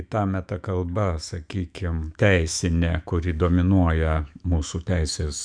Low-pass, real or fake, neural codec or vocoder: 9.9 kHz; fake; autoencoder, 48 kHz, 128 numbers a frame, DAC-VAE, trained on Japanese speech